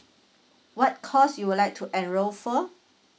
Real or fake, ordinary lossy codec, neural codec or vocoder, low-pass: real; none; none; none